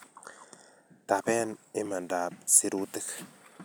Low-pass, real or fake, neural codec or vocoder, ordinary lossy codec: none; real; none; none